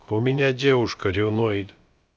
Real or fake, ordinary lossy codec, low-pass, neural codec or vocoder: fake; none; none; codec, 16 kHz, about 1 kbps, DyCAST, with the encoder's durations